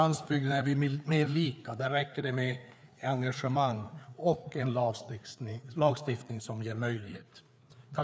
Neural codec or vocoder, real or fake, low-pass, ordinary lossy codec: codec, 16 kHz, 4 kbps, FreqCodec, larger model; fake; none; none